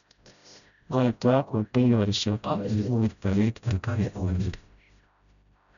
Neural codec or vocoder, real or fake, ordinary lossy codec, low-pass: codec, 16 kHz, 0.5 kbps, FreqCodec, smaller model; fake; none; 7.2 kHz